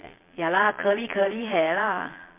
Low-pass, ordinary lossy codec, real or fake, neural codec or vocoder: 3.6 kHz; MP3, 24 kbps; fake; vocoder, 22.05 kHz, 80 mel bands, Vocos